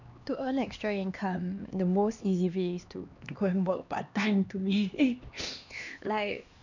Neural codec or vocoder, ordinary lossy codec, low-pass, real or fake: codec, 16 kHz, 2 kbps, X-Codec, HuBERT features, trained on LibriSpeech; none; 7.2 kHz; fake